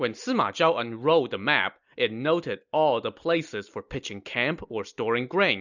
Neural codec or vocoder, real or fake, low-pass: none; real; 7.2 kHz